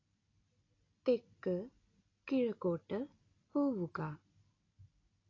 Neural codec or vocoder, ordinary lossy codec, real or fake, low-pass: none; AAC, 32 kbps; real; 7.2 kHz